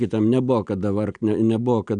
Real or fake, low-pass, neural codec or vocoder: real; 9.9 kHz; none